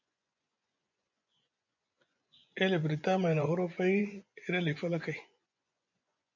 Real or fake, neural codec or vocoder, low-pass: fake; vocoder, 24 kHz, 100 mel bands, Vocos; 7.2 kHz